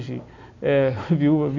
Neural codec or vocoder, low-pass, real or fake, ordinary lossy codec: none; 7.2 kHz; real; none